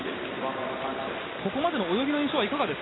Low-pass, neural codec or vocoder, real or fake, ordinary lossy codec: 7.2 kHz; none; real; AAC, 16 kbps